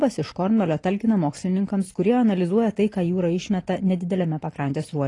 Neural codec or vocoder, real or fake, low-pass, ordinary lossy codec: none; real; 10.8 kHz; AAC, 32 kbps